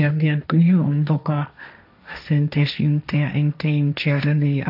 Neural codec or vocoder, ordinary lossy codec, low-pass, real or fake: codec, 16 kHz, 1.1 kbps, Voila-Tokenizer; none; 5.4 kHz; fake